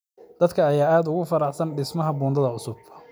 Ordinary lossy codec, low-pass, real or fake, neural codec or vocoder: none; none; real; none